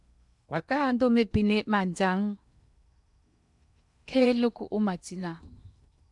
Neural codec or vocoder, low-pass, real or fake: codec, 16 kHz in and 24 kHz out, 0.8 kbps, FocalCodec, streaming, 65536 codes; 10.8 kHz; fake